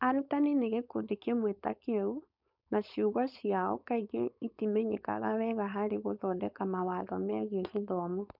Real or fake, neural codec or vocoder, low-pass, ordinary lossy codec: fake; codec, 16 kHz, 4.8 kbps, FACodec; 5.4 kHz; none